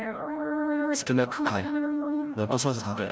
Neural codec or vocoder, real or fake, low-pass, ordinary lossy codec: codec, 16 kHz, 0.5 kbps, FreqCodec, larger model; fake; none; none